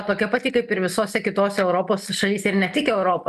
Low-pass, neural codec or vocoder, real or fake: 14.4 kHz; none; real